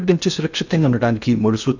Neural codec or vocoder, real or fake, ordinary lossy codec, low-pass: codec, 16 kHz in and 24 kHz out, 0.6 kbps, FocalCodec, streaming, 2048 codes; fake; none; 7.2 kHz